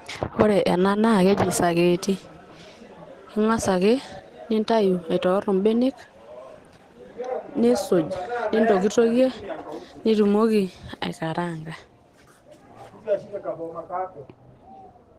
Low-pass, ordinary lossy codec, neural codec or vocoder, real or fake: 14.4 kHz; Opus, 16 kbps; none; real